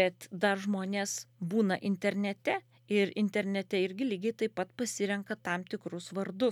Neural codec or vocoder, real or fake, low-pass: none; real; 19.8 kHz